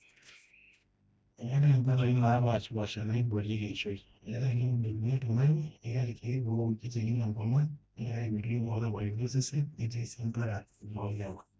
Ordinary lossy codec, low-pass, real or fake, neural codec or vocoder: none; none; fake; codec, 16 kHz, 1 kbps, FreqCodec, smaller model